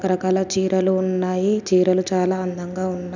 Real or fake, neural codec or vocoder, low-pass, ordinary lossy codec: real; none; 7.2 kHz; none